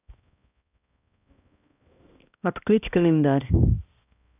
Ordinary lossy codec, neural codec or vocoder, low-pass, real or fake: none; codec, 16 kHz, 1 kbps, X-Codec, HuBERT features, trained on balanced general audio; 3.6 kHz; fake